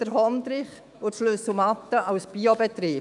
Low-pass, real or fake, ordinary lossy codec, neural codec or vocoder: 10.8 kHz; fake; none; autoencoder, 48 kHz, 128 numbers a frame, DAC-VAE, trained on Japanese speech